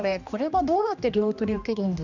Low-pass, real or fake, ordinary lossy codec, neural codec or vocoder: 7.2 kHz; fake; none; codec, 16 kHz, 1 kbps, X-Codec, HuBERT features, trained on general audio